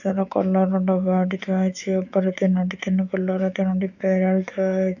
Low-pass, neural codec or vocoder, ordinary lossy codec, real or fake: 7.2 kHz; autoencoder, 48 kHz, 128 numbers a frame, DAC-VAE, trained on Japanese speech; none; fake